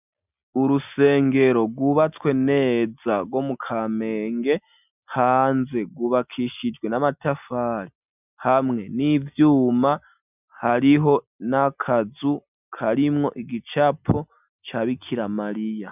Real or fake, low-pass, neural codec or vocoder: real; 3.6 kHz; none